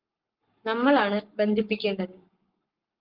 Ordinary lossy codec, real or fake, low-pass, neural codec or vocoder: Opus, 32 kbps; fake; 5.4 kHz; codec, 44.1 kHz, 7.8 kbps, Pupu-Codec